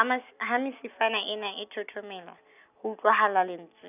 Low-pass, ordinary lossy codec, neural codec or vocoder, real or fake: 3.6 kHz; none; none; real